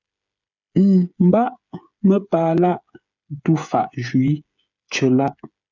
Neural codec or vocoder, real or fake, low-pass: codec, 16 kHz, 8 kbps, FreqCodec, smaller model; fake; 7.2 kHz